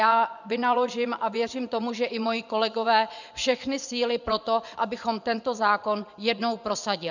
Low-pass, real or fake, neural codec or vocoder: 7.2 kHz; fake; vocoder, 24 kHz, 100 mel bands, Vocos